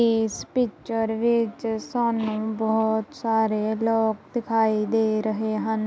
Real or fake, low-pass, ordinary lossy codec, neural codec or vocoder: real; none; none; none